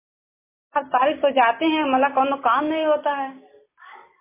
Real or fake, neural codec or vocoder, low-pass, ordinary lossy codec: real; none; 3.6 kHz; MP3, 16 kbps